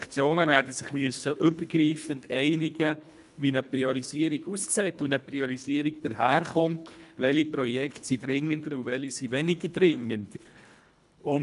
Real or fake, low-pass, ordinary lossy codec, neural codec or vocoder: fake; 10.8 kHz; MP3, 96 kbps; codec, 24 kHz, 1.5 kbps, HILCodec